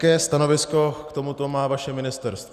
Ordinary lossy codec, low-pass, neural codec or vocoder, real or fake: Opus, 64 kbps; 14.4 kHz; none; real